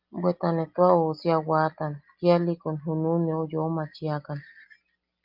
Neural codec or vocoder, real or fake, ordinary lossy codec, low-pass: none; real; Opus, 24 kbps; 5.4 kHz